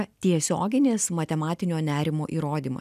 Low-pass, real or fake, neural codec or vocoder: 14.4 kHz; real; none